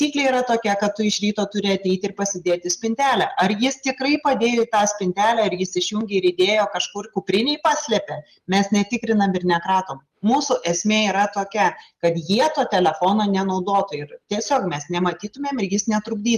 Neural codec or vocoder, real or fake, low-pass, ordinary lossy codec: none; real; 14.4 kHz; Opus, 32 kbps